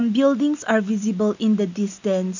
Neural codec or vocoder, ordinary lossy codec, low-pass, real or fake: none; none; 7.2 kHz; real